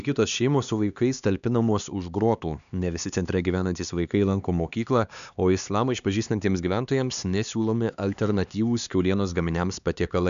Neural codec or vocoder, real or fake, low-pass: codec, 16 kHz, 2 kbps, X-Codec, HuBERT features, trained on LibriSpeech; fake; 7.2 kHz